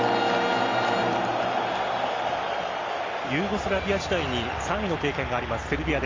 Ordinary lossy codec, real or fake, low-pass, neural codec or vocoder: Opus, 32 kbps; real; 7.2 kHz; none